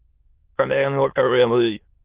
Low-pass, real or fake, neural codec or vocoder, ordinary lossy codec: 3.6 kHz; fake; autoencoder, 22.05 kHz, a latent of 192 numbers a frame, VITS, trained on many speakers; Opus, 16 kbps